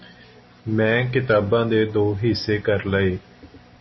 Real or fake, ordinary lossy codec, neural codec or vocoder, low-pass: real; MP3, 24 kbps; none; 7.2 kHz